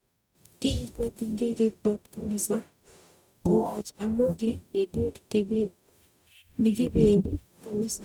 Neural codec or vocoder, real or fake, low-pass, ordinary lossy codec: codec, 44.1 kHz, 0.9 kbps, DAC; fake; 19.8 kHz; none